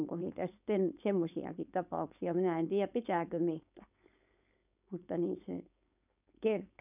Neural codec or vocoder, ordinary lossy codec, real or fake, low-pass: codec, 16 kHz, 4.8 kbps, FACodec; none; fake; 3.6 kHz